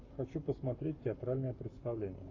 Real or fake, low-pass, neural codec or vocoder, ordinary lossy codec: fake; 7.2 kHz; codec, 44.1 kHz, 7.8 kbps, Pupu-Codec; AAC, 48 kbps